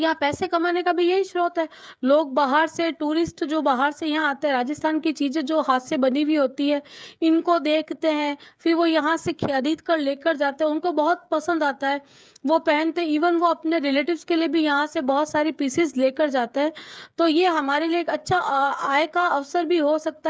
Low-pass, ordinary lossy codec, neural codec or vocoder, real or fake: none; none; codec, 16 kHz, 8 kbps, FreqCodec, smaller model; fake